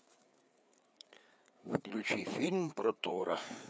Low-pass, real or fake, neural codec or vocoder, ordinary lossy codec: none; fake; codec, 16 kHz, 4 kbps, FreqCodec, larger model; none